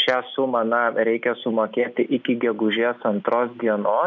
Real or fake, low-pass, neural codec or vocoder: real; 7.2 kHz; none